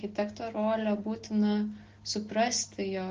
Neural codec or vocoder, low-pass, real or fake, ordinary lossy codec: none; 7.2 kHz; real; Opus, 16 kbps